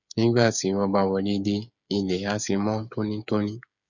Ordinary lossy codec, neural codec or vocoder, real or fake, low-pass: none; codec, 16 kHz, 8 kbps, FreqCodec, smaller model; fake; 7.2 kHz